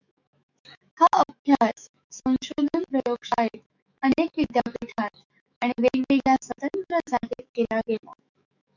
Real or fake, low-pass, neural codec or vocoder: fake; 7.2 kHz; autoencoder, 48 kHz, 128 numbers a frame, DAC-VAE, trained on Japanese speech